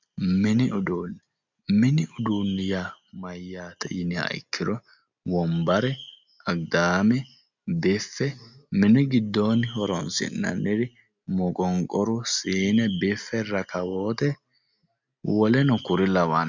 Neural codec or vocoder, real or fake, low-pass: none; real; 7.2 kHz